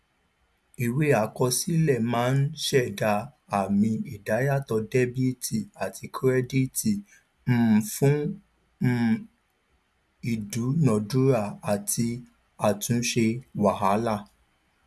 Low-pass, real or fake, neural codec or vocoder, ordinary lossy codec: none; real; none; none